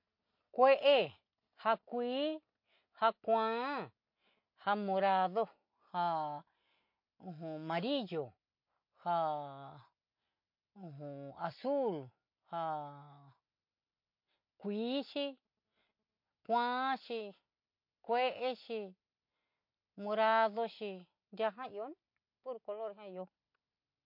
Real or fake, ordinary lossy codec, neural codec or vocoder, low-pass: real; MP3, 32 kbps; none; 5.4 kHz